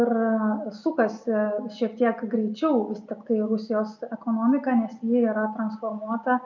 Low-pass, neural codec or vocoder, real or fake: 7.2 kHz; none; real